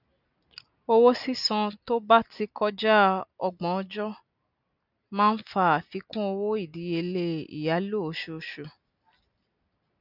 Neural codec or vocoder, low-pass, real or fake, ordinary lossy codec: none; 5.4 kHz; real; none